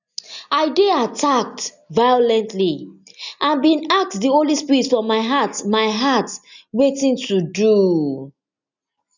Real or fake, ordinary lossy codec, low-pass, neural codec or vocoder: real; none; 7.2 kHz; none